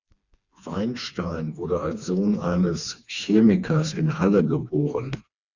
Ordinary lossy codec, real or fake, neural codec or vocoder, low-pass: Opus, 64 kbps; fake; codec, 16 kHz, 2 kbps, FreqCodec, smaller model; 7.2 kHz